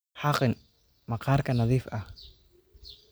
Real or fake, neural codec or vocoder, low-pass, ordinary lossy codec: real; none; none; none